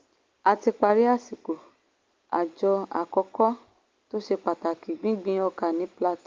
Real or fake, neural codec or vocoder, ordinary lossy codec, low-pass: real; none; Opus, 32 kbps; 7.2 kHz